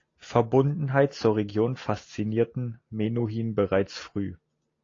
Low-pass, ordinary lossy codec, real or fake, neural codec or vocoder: 7.2 kHz; AAC, 32 kbps; real; none